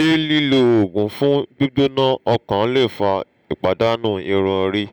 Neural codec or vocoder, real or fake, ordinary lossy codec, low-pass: none; real; none; none